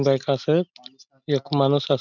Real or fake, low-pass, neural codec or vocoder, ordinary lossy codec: real; 7.2 kHz; none; none